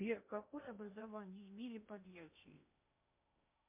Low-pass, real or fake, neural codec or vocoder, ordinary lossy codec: 3.6 kHz; fake; codec, 16 kHz in and 24 kHz out, 0.8 kbps, FocalCodec, streaming, 65536 codes; MP3, 32 kbps